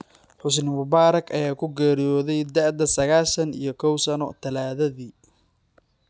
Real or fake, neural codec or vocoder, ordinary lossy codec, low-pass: real; none; none; none